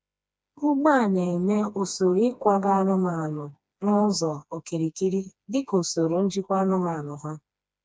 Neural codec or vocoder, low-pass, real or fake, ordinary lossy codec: codec, 16 kHz, 2 kbps, FreqCodec, smaller model; none; fake; none